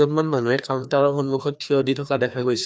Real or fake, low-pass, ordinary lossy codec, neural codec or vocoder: fake; none; none; codec, 16 kHz, 2 kbps, FreqCodec, larger model